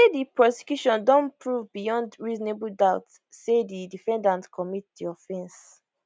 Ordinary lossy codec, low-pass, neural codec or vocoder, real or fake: none; none; none; real